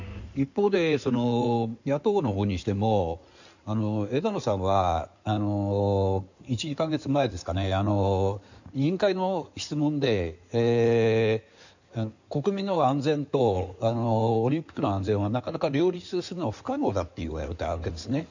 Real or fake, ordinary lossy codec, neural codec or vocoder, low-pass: fake; none; codec, 16 kHz in and 24 kHz out, 2.2 kbps, FireRedTTS-2 codec; 7.2 kHz